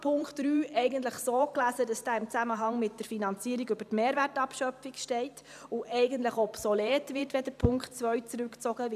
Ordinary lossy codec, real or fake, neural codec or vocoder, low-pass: none; fake; vocoder, 44.1 kHz, 128 mel bands every 512 samples, BigVGAN v2; 14.4 kHz